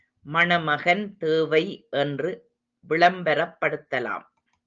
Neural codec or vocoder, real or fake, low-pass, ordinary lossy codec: none; real; 7.2 kHz; Opus, 32 kbps